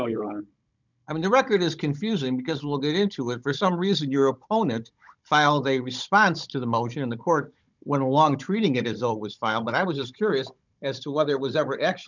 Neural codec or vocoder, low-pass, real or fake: codec, 16 kHz, 16 kbps, FunCodec, trained on Chinese and English, 50 frames a second; 7.2 kHz; fake